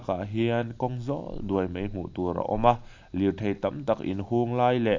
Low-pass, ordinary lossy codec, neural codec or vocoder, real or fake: 7.2 kHz; AAC, 48 kbps; none; real